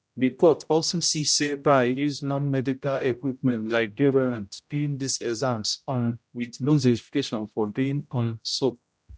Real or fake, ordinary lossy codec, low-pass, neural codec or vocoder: fake; none; none; codec, 16 kHz, 0.5 kbps, X-Codec, HuBERT features, trained on general audio